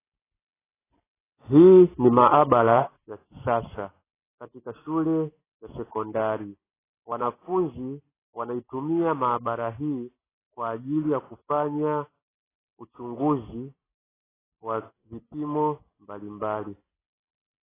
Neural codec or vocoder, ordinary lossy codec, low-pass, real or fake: none; AAC, 16 kbps; 3.6 kHz; real